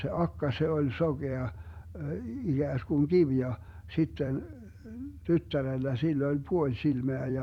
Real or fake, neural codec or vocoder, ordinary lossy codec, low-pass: fake; vocoder, 44.1 kHz, 128 mel bands every 256 samples, BigVGAN v2; none; 19.8 kHz